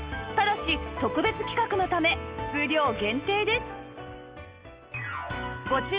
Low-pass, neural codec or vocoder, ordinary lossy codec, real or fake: 3.6 kHz; none; Opus, 24 kbps; real